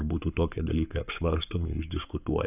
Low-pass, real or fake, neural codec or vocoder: 3.6 kHz; fake; codec, 44.1 kHz, 7.8 kbps, Pupu-Codec